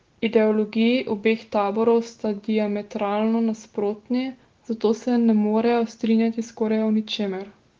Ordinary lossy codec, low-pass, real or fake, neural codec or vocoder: Opus, 16 kbps; 7.2 kHz; real; none